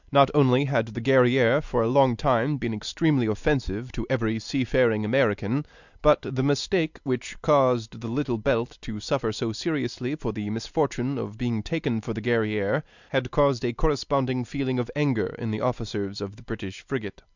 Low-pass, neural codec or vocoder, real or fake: 7.2 kHz; none; real